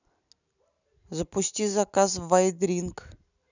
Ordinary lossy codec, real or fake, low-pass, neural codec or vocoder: none; real; 7.2 kHz; none